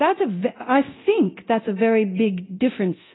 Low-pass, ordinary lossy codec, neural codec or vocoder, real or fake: 7.2 kHz; AAC, 16 kbps; codec, 24 kHz, 0.9 kbps, DualCodec; fake